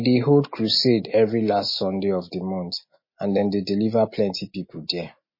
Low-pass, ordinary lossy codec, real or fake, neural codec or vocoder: 5.4 kHz; MP3, 24 kbps; real; none